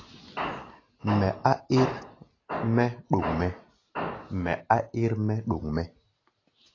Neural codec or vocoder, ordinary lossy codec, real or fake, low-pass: none; AAC, 32 kbps; real; 7.2 kHz